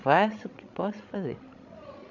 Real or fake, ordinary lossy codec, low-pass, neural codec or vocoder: fake; none; 7.2 kHz; codec, 16 kHz, 16 kbps, FreqCodec, larger model